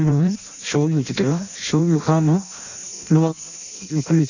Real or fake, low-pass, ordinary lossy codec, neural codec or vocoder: fake; 7.2 kHz; none; codec, 16 kHz in and 24 kHz out, 0.6 kbps, FireRedTTS-2 codec